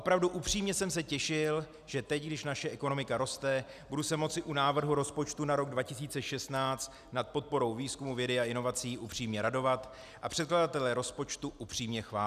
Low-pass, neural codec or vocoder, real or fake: 14.4 kHz; none; real